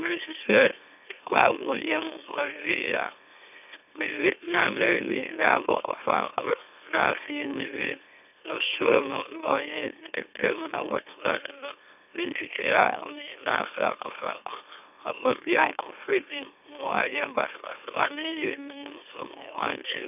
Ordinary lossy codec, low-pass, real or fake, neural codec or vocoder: none; 3.6 kHz; fake; autoencoder, 44.1 kHz, a latent of 192 numbers a frame, MeloTTS